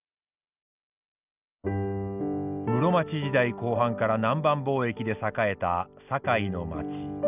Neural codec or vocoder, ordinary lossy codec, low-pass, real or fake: none; none; 3.6 kHz; real